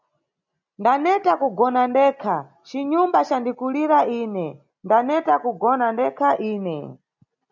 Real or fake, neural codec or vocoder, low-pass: real; none; 7.2 kHz